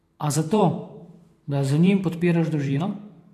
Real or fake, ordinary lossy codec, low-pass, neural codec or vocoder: fake; AAC, 64 kbps; 14.4 kHz; vocoder, 44.1 kHz, 128 mel bands every 512 samples, BigVGAN v2